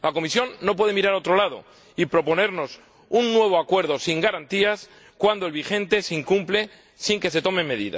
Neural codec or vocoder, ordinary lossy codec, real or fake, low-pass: none; none; real; none